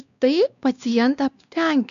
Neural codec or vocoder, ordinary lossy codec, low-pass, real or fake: codec, 16 kHz, 2 kbps, X-Codec, WavLM features, trained on Multilingual LibriSpeech; MP3, 96 kbps; 7.2 kHz; fake